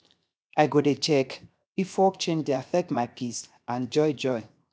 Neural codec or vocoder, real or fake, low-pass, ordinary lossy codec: codec, 16 kHz, 0.7 kbps, FocalCodec; fake; none; none